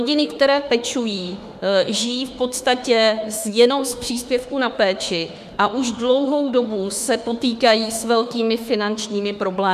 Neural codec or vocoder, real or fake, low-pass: autoencoder, 48 kHz, 32 numbers a frame, DAC-VAE, trained on Japanese speech; fake; 14.4 kHz